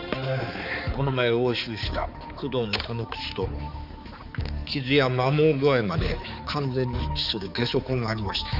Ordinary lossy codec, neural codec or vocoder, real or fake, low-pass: none; codec, 16 kHz, 4 kbps, X-Codec, HuBERT features, trained on balanced general audio; fake; 5.4 kHz